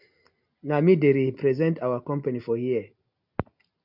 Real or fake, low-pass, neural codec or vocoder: real; 5.4 kHz; none